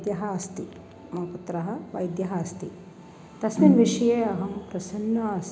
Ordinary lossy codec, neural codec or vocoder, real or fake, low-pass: none; none; real; none